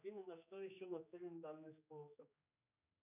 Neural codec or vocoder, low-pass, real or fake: codec, 16 kHz, 2 kbps, X-Codec, HuBERT features, trained on general audio; 3.6 kHz; fake